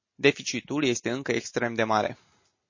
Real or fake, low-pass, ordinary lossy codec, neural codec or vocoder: real; 7.2 kHz; MP3, 32 kbps; none